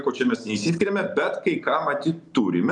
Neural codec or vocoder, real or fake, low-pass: none; real; 10.8 kHz